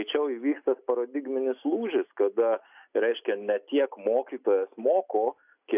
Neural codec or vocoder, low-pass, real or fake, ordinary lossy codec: none; 3.6 kHz; real; AAC, 32 kbps